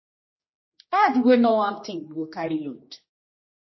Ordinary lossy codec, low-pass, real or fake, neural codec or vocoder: MP3, 24 kbps; 7.2 kHz; fake; codec, 16 kHz, 2 kbps, X-Codec, HuBERT features, trained on general audio